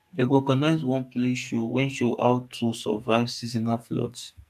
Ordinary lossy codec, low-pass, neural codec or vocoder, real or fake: AAC, 96 kbps; 14.4 kHz; codec, 44.1 kHz, 2.6 kbps, SNAC; fake